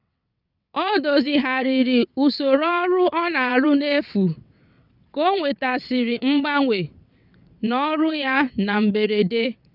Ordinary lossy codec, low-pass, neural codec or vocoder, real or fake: none; 5.4 kHz; vocoder, 22.05 kHz, 80 mel bands, WaveNeXt; fake